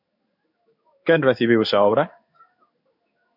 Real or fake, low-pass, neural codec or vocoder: fake; 5.4 kHz; codec, 16 kHz in and 24 kHz out, 1 kbps, XY-Tokenizer